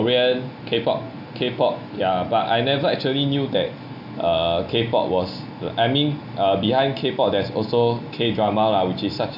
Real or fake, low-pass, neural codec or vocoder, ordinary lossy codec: real; 5.4 kHz; none; none